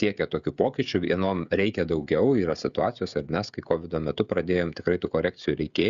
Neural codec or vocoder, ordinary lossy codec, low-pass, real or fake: codec, 16 kHz, 16 kbps, FreqCodec, smaller model; Opus, 64 kbps; 7.2 kHz; fake